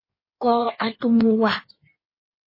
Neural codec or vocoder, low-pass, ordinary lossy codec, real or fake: codec, 16 kHz in and 24 kHz out, 1.1 kbps, FireRedTTS-2 codec; 5.4 kHz; MP3, 24 kbps; fake